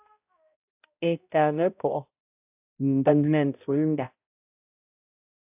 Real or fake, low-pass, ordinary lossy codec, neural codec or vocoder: fake; 3.6 kHz; AAC, 32 kbps; codec, 16 kHz, 0.5 kbps, X-Codec, HuBERT features, trained on balanced general audio